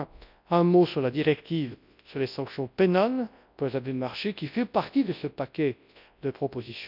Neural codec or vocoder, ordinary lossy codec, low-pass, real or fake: codec, 24 kHz, 0.9 kbps, WavTokenizer, large speech release; none; 5.4 kHz; fake